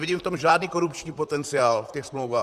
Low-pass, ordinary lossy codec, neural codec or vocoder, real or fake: 14.4 kHz; Opus, 64 kbps; vocoder, 44.1 kHz, 128 mel bands, Pupu-Vocoder; fake